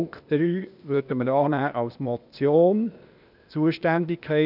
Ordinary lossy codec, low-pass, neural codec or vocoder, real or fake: none; 5.4 kHz; codec, 16 kHz, 0.8 kbps, ZipCodec; fake